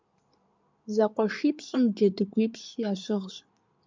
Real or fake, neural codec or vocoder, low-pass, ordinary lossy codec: fake; codec, 16 kHz in and 24 kHz out, 2.2 kbps, FireRedTTS-2 codec; 7.2 kHz; MP3, 64 kbps